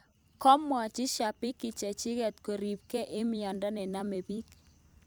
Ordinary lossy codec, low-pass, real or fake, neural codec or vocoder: none; none; fake; vocoder, 44.1 kHz, 128 mel bands every 256 samples, BigVGAN v2